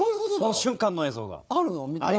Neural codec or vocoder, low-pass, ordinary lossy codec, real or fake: codec, 16 kHz, 4 kbps, FunCodec, trained on Chinese and English, 50 frames a second; none; none; fake